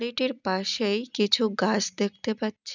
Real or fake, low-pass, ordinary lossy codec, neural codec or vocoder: real; 7.2 kHz; none; none